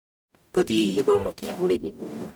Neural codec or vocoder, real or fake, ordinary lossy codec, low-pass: codec, 44.1 kHz, 0.9 kbps, DAC; fake; none; none